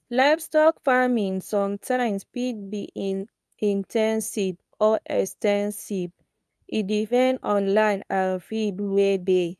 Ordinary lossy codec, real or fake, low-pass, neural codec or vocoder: none; fake; none; codec, 24 kHz, 0.9 kbps, WavTokenizer, medium speech release version 2